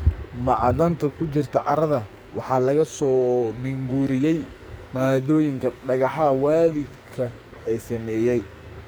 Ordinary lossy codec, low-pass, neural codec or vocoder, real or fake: none; none; codec, 44.1 kHz, 2.6 kbps, SNAC; fake